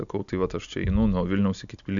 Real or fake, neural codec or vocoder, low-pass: real; none; 7.2 kHz